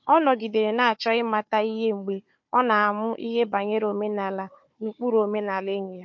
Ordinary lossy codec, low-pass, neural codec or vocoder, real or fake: MP3, 48 kbps; 7.2 kHz; codec, 16 kHz, 4 kbps, FunCodec, trained on Chinese and English, 50 frames a second; fake